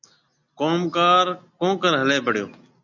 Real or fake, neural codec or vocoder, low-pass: real; none; 7.2 kHz